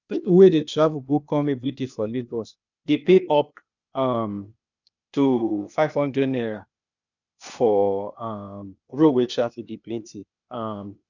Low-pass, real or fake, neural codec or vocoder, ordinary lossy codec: 7.2 kHz; fake; codec, 16 kHz, 0.8 kbps, ZipCodec; none